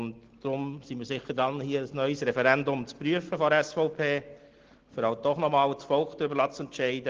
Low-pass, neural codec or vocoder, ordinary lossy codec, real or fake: 7.2 kHz; none; Opus, 16 kbps; real